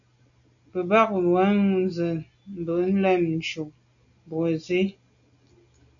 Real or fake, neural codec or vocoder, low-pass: real; none; 7.2 kHz